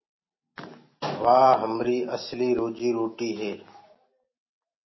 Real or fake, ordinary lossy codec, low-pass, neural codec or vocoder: real; MP3, 24 kbps; 7.2 kHz; none